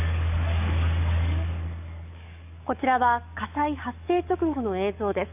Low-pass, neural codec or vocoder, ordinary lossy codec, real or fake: 3.6 kHz; codec, 44.1 kHz, 7.8 kbps, DAC; none; fake